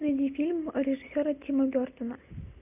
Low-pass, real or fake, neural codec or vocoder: 3.6 kHz; real; none